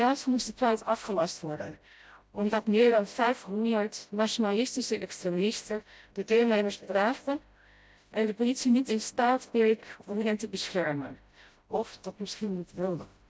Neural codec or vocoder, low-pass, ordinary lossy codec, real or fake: codec, 16 kHz, 0.5 kbps, FreqCodec, smaller model; none; none; fake